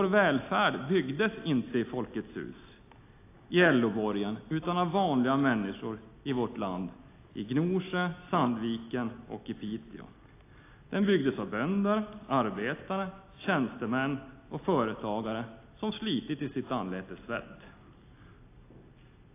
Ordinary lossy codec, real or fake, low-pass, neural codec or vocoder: AAC, 24 kbps; real; 3.6 kHz; none